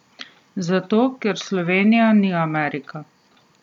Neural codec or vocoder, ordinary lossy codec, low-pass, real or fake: none; none; 19.8 kHz; real